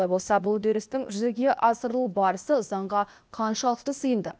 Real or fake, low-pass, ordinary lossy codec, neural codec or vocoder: fake; none; none; codec, 16 kHz, 0.8 kbps, ZipCodec